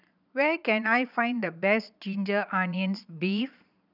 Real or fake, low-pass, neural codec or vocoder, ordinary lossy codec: fake; 5.4 kHz; vocoder, 22.05 kHz, 80 mel bands, Vocos; none